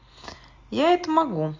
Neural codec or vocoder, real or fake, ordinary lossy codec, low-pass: none; real; Opus, 32 kbps; 7.2 kHz